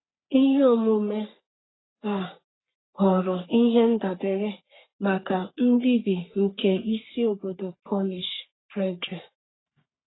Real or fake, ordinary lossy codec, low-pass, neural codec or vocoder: fake; AAC, 16 kbps; 7.2 kHz; codec, 44.1 kHz, 3.4 kbps, Pupu-Codec